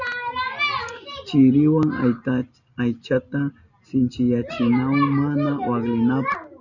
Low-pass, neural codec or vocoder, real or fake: 7.2 kHz; none; real